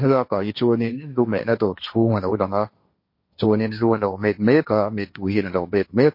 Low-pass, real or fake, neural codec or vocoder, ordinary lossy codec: 5.4 kHz; fake; codec, 16 kHz, 1.1 kbps, Voila-Tokenizer; MP3, 32 kbps